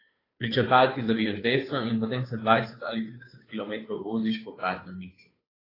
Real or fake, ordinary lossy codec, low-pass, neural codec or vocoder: fake; AAC, 24 kbps; 5.4 kHz; codec, 16 kHz, 2 kbps, FunCodec, trained on Chinese and English, 25 frames a second